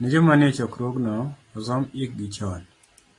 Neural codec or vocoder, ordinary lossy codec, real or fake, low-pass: none; AAC, 32 kbps; real; 10.8 kHz